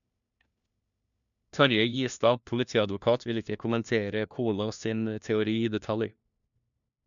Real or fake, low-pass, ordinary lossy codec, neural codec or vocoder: fake; 7.2 kHz; MP3, 96 kbps; codec, 16 kHz, 1 kbps, FunCodec, trained on LibriTTS, 50 frames a second